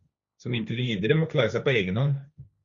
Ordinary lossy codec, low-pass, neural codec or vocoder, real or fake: Opus, 64 kbps; 7.2 kHz; codec, 16 kHz, 1.1 kbps, Voila-Tokenizer; fake